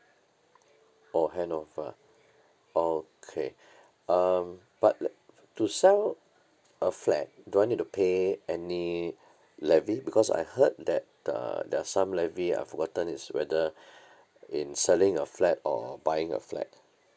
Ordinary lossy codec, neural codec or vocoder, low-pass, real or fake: none; none; none; real